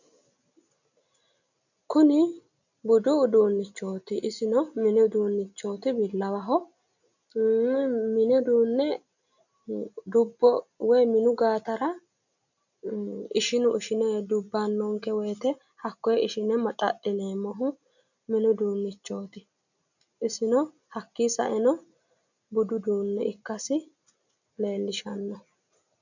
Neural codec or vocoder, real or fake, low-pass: none; real; 7.2 kHz